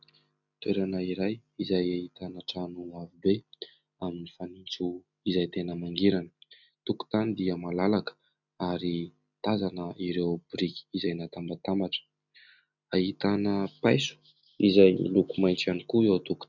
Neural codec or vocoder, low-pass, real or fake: none; 7.2 kHz; real